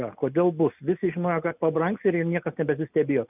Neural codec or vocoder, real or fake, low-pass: none; real; 3.6 kHz